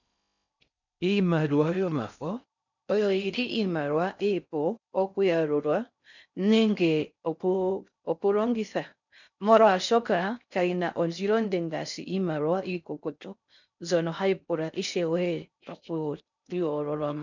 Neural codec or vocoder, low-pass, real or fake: codec, 16 kHz in and 24 kHz out, 0.6 kbps, FocalCodec, streaming, 4096 codes; 7.2 kHz; fake